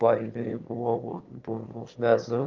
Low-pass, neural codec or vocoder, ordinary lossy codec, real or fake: 7.2 kHz; autoencoder, 22.05 kHz, a latent of 192 numbers a frame, VITS, trained on one speaker; Opus, 32 kbps; fake